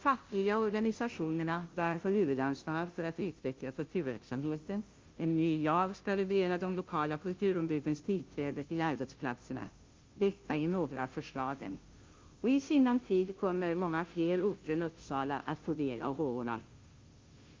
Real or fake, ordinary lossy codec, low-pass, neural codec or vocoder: fake; Opus, 32 kbps; 7.2 kHz; codec, 16 kHz, 0.5 kbps, FunCodec, trained on Chinese and English, 25 frames a second